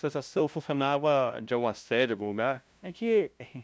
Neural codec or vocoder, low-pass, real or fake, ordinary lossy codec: codec, 16 kHz, 0.5 kbps, FunCodec, trained on LibriTTS, 25 frames a second; none; fake; none